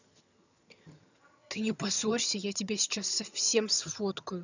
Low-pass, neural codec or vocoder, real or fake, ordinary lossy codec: 7.2 kHz; vocoder, 22.05 kHz, 80 mel bands, HiFi-GAN; fake; none